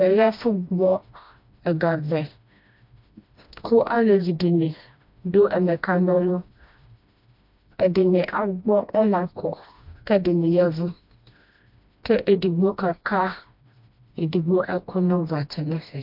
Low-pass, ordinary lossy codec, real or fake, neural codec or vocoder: 5.4 kHz; MP3, 48 kbps; fake; codec, 16 kHz, 1 kbps, FreqCodec, smaller model